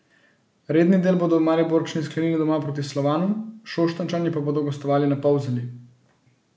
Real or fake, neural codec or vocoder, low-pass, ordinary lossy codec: real; none; none; none